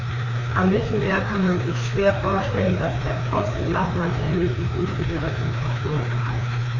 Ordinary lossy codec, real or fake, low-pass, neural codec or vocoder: none; fake; 7.2 kHz; codec, 16 kHz, 2 kbps, FreqCodec, larger model